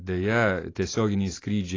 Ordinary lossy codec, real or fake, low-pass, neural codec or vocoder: AAC, 32 kbps; real; 7.2 kHz; none